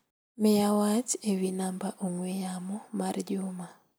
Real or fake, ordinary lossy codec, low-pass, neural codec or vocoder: real; none; none; none